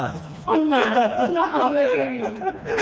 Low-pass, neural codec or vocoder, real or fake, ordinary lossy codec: none; codec, 16 kHz, 2 kbps, FreqCodec, smaller model; fake; none